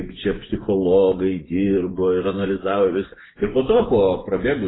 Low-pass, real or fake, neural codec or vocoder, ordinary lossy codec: 7.2 kHz; fake; vocoder, 24 kHz, 100 mel bands, Vocos; AAC, 16 kbps